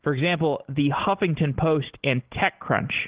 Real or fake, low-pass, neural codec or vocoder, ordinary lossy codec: real; 3.6 kHz; none; Opus, 16 kbps